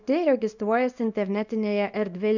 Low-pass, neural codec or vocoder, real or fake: 7.2 kHz; codec, 24 kHz, 0.9 kbps, WavTokenizer, small release; fake